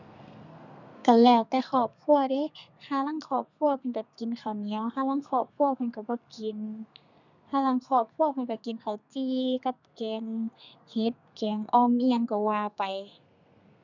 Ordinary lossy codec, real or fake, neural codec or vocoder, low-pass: none; fake; codec, 32 kHz, 1.9 kbps, SNAC; 7.2 kHz